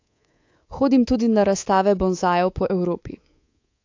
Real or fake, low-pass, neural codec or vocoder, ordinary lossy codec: fake; 7.2 kHz; codec, 24 kHz, 3.1 kbps, DualCodec; AAC, 48 kbps